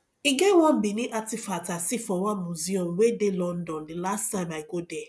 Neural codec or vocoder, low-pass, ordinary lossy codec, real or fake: none; none; none; real